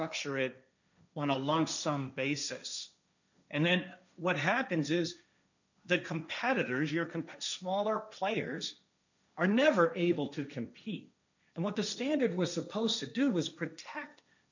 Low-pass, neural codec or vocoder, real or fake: 7.2 kHz; codec, 16 kHz, 1.1 kbps, Voila-Tokenizer; fake